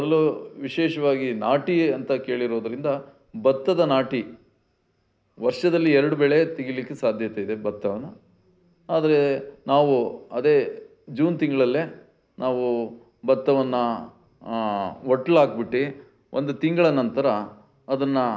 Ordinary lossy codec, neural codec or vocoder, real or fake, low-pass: none; none; real; none